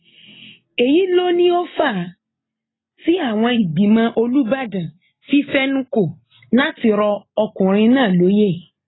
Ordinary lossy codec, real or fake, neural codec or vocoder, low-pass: AAC, 16 kbps; real; none; 7.2 kHz